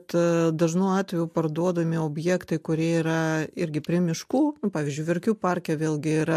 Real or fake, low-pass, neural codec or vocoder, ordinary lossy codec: real; 14.4 kHz; none; MP3, 64 kbps